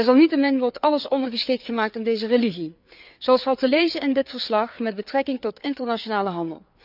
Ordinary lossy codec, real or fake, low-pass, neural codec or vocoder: none; fake; 5.4 kHz; codec, 16 kHz, 4 kbps, FreqCodec, larger model